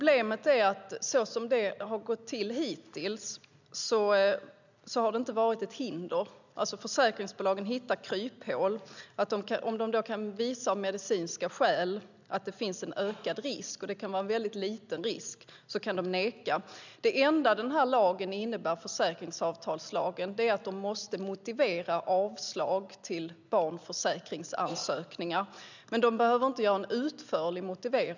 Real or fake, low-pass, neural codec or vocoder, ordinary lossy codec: real; 7.2 kHz; none; none